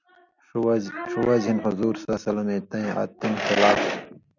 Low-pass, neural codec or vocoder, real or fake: 7.2 kHz; none; real